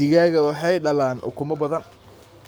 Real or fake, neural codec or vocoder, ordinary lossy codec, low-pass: fake; codec, 44.1 kHz, 7.8 kbps, Pupu-Codec; none; none